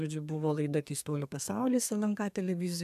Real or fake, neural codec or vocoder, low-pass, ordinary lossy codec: fake; codec, 32 kHz, 1.9 kbps, SNAC; 14.4 kHz; AAC, 96 kbps